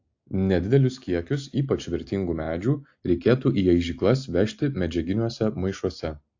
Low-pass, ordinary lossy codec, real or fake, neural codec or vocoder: 7.2 kHz; AAC, 48 kbps; real; none